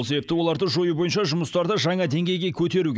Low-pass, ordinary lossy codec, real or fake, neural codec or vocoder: none; none; real; none